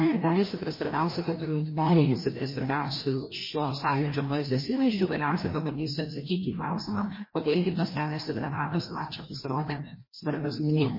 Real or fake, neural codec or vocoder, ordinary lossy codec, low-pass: fake; codec, 16 kHz, 1 kbps, FreqCodec, larger model; MP3, 24 kbps; 5.4 kHz